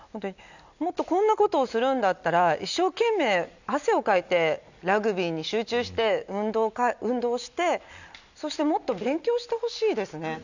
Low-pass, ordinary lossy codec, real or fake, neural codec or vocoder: 7.2 kHz; none; real; none